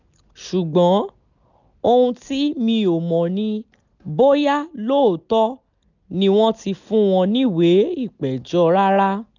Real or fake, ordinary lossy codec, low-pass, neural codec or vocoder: real; none; 7.2 kHz; none